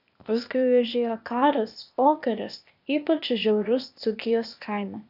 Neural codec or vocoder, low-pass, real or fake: codec, 16 kHz, 0.8 kbps, ZipCodec; 5.4 kHz; fake